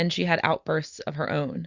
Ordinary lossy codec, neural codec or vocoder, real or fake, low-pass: Opus, 64 kbps; none; real; 7.2 kHz